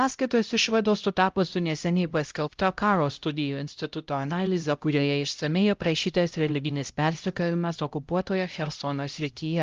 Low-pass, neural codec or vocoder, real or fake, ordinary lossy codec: 7.2 kHz; codec, 16 kHz, 0.5 kbps, X-Codec, HuBERT features, trained on LibriSpeech; fake; Opus, 32 kbps